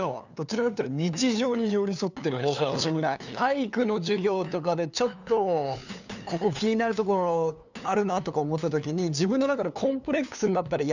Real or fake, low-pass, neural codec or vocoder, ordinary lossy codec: fake; 7.2 kHz; codec, 16 kHz, 2 kbps, FunCodec, trained on LibriTTS, 25 frames a second; none